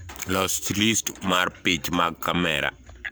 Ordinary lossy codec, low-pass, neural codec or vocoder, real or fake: none; none; codec, 44.1 kHz, 7.8 kbps, Pupu-Codec; fake